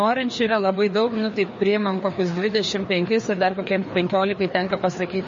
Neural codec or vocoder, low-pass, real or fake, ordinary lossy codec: codec, 16 kHz, 2 kbps, FreqCodec, larger model; 7.2 kHz; fake; MP3, 32 kbps